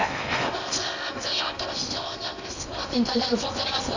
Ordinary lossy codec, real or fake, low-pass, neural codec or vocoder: none; fake; 7.2 kHz; codec, 16 kHz in and 24 kHz out, 0.8 kbps, FocalCodec, streaming, 65536 codes